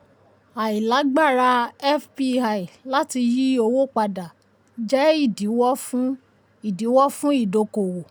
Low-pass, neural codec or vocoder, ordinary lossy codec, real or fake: none; none; none; real